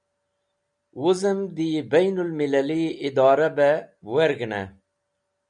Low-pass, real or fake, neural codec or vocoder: 9.9 kHz; real; none